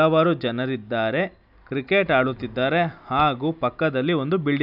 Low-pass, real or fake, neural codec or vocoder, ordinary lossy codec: 5.4 kHz; real; none; AAC, 48 kbps